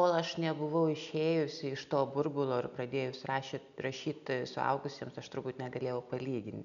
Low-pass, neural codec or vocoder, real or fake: 7.2 kHz; none; real